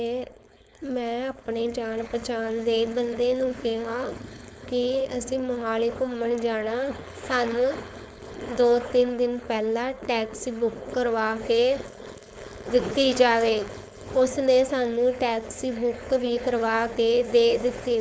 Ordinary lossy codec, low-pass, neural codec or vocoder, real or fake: none; none; codec, 16 kHz, 4.8 kbps, FACodec; fake